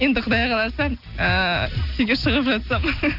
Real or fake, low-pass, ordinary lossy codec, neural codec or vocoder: real; 5.4 kHz; none; none